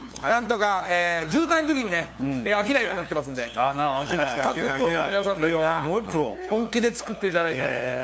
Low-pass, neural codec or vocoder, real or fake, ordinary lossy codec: none; codec, 16 kHz, 2 kbps, FunCodec, trained on LibriTTS, 25 frames a second; fake; none